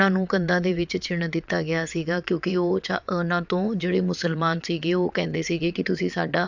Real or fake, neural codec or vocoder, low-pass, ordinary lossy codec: fake; vocoder, 22.05 kHz, 80 mel bands, WaveNeXt; 7.2 kHz; none